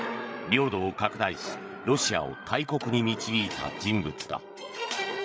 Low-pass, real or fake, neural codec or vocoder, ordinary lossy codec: none; fake; codec, 16 kHz, 8 kbps, FreqCodec, larger model; none